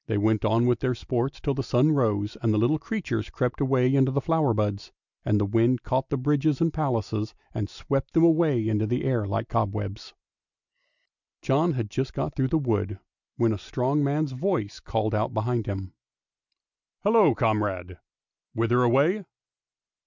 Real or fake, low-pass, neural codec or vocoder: real; 7.2 kHz; none